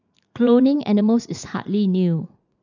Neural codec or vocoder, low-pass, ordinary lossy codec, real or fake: vocoder, 44.1 kHz, 80 mel bands, Vocos; 7.2 kHz; none; fake